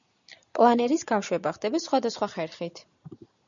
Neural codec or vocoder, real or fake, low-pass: none; real; 7.2 kHz